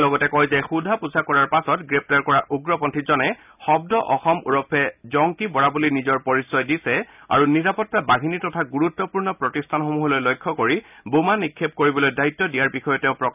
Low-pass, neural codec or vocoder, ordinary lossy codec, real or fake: 3.6 kHz; none; none; real